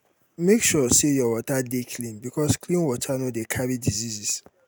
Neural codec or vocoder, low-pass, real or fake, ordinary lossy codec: none; none; real; none